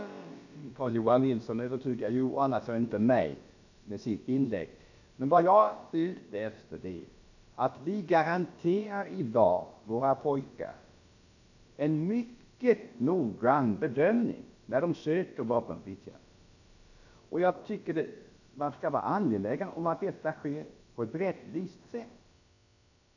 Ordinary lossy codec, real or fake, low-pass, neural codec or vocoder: none; fake; 7.2 kHz; codec, 16 kHz, about 1 kbps, DyCAST, with the encoder's durations